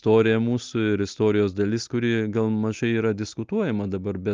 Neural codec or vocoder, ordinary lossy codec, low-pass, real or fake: none; Opus, 24 kbps; 7.2 kHz; real